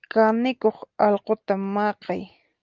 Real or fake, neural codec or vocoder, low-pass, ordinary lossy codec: real; none; 7.2 kHz; Opus, 24 kbps